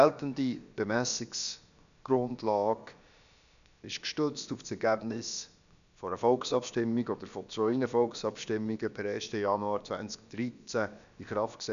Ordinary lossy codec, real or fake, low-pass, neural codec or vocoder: none; fake; 7.2 kHz; codec, 16 kHz, about 1 kbps, DyCAST, with the encoder's durations